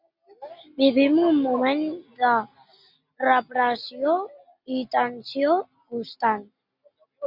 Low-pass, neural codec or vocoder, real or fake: 5.4 kHz; none; real